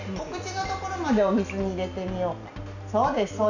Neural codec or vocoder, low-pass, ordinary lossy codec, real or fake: none; 7.2 kHz; none; real